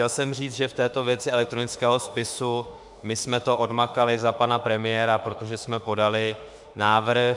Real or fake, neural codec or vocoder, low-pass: fake; autoencoder, 48 kHz, 32 numbers a frame, DAC-VAE, trained on Japanese speech; 10.8 kHz